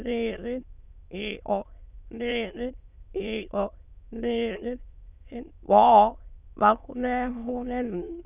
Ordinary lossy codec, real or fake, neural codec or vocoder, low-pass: none; fake; autoencoder, 22.05 kHz, a latent of 192 numbers a frame, VITS, trained on many speakers; 3.6 kHz